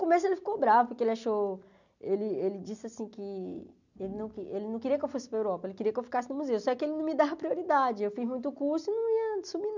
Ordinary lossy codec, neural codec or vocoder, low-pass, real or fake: none; none; 7.2 kHz; real